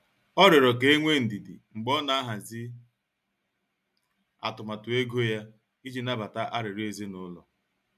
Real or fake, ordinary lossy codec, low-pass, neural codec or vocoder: real; none; 14.4 kHz; none